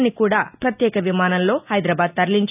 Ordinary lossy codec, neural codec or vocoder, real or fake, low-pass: none; none; real; 3.6 kHz